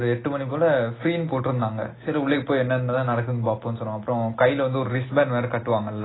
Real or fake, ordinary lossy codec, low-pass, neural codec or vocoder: real; AAC, 16 kbps; 7.2 kHz; none